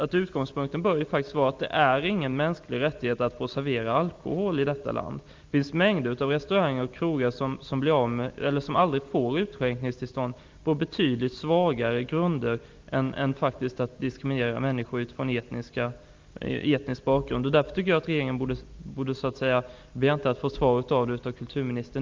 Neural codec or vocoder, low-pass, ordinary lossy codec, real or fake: none; 7.2 kHz; Opus, 32 kbps; real